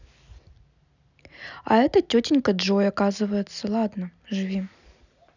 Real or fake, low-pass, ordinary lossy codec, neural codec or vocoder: real; 7.2 kHz; none; none